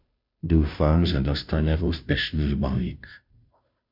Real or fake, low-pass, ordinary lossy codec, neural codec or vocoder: fake; 5.4 kHz; MP3, 48 kbps; codec, 16 kHz, 0.5 kbps, FunCodec, trained on Chinese and English, 25 frames a second